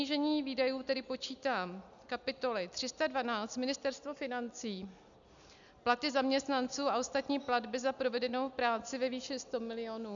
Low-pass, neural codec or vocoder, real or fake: 7.2 kHz; none; real